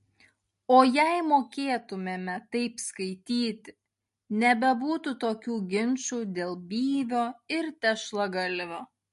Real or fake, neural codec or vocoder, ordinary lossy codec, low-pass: real; none; MP3, 48 kbps; 14.4 kHz